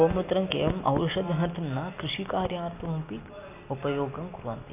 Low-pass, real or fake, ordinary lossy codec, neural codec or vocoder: 3.6 kHz; real; none; none